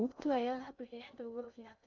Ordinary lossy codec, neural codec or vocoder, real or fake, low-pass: none; codec, 16 kHz in and 24 kHz out, 0.6 kbps, FocalCodec, streaming, 2048 codes; fake; 7.2 kHz